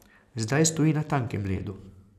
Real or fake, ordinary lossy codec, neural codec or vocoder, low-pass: real; none; none; 14.4 kHz